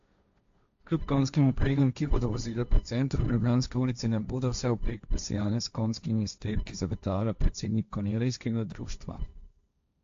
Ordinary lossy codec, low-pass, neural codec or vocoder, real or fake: AAC, 64 kbps; 7.2 kHz; codec, 16 kHz, 1.1 kbps, Voila-Tokenizer; fake